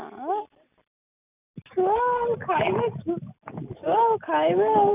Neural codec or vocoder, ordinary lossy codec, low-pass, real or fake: none; none; 3.6 kHz; real